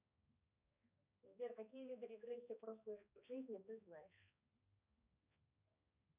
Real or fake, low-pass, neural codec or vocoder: fake; 3.6 kHz; codec, 16 kHz, 2 kbps, X-Codec, HuBERT features, trained on balanced general audio